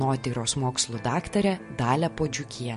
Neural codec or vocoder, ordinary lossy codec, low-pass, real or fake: none; MP3, 48 kbps; 10.8 kHz; real